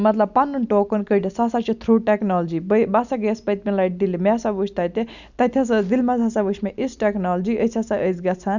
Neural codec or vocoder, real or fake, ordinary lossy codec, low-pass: none; real; none; 7.2 kHz